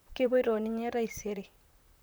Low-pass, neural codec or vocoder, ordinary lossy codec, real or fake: none; vocoder, 44.1 kHz, 128 mel bands, Pupu-Vocoder; none; fake